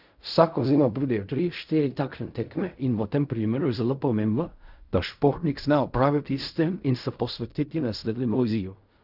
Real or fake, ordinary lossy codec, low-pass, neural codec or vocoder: fake; none; 5.4 kHz; codec, 16 kHz in and 24 kHz out, 0.4 kbps, LongCat-Audio-Codec, fine tuned four codebook decoder